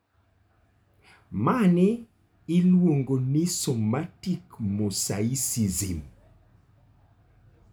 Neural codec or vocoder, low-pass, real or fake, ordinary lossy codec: none; none; real; none